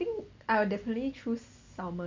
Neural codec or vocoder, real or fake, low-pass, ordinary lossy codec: none; real; 7.2 kHz; MP3, 48 kbps